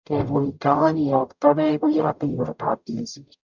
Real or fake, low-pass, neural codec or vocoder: fake; 7.2 kHz; codec, 44.1 kHz, 0.9 kbps, DAC